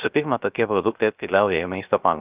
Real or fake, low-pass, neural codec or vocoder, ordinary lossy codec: fake; 3.6 kHz; codec, 16 kHz, 0.3 kbps, FocalCodec; Opus, 24 kbps